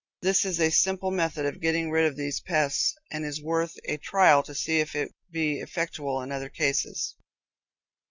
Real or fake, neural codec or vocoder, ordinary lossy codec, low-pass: real; none; Opus, 64 kbps; 7.2 kHz